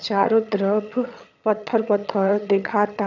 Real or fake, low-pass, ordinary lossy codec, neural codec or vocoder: fake; 7.2 kHz; none; vocoder, 22.05 kHz, 80 mel bands, HiFi-GAN